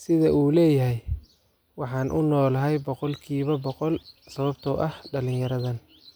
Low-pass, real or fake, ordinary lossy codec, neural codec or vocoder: none; real; none; none